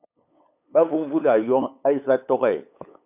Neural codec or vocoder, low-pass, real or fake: codec, 16 kHz, 8 kbps, FunCodec, trained on LibriTTS, 25 frames a second; 3.6 kHz; fake